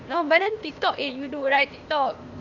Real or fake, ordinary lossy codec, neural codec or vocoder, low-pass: fake; none; codec, 16 kHz, 0.8 kbps, ZipCodec; 7.2 kHz